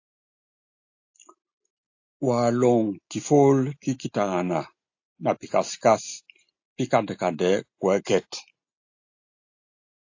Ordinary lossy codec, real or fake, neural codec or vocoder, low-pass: AAC, 48 kbps; real; none; 7.2 kHz